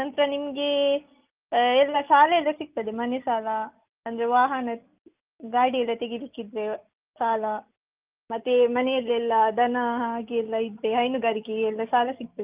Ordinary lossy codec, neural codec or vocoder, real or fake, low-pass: Opus, 24 kbps; none; real; 3.6 kHz